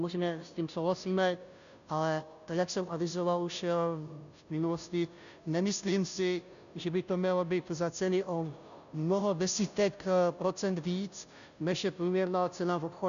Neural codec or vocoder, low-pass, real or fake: codec, 16 kHz, 0.5 kbps, FunCodec, trained on Chinese and English, 25 frames a second; 7.2 kHz; fake